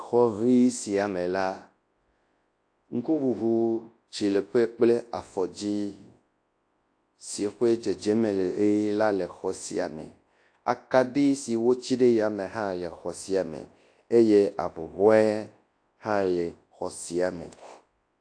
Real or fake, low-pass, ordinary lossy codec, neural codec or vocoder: fake; 9.9 kHz; AAC, 48 kbps; codec, 24 kHz, 0.9 kbps, WavTokenizer, large speech release